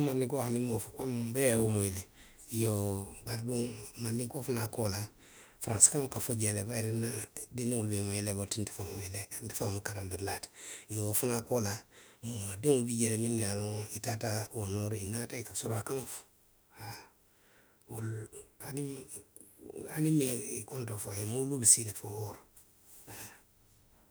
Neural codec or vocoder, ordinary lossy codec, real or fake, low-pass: autoencoder, 48 kHz, 32 numbers a frame, DAC-VAE, trained on Japanese speech; none; fake; none